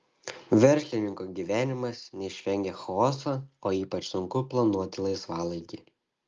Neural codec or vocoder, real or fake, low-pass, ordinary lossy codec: none; real; 7.2 kHz; Opus, 32 kbps